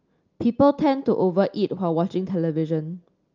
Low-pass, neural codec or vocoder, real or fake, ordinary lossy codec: 7.2 kHz; none; real; Opus, 24 kbps